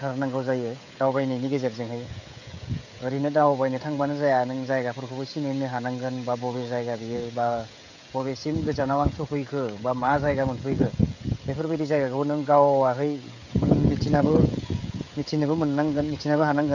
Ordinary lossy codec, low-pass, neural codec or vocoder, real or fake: none; 7.2 kHz; codec, 16 kHz, 16 kbps, FreqCodec, smaller model; fake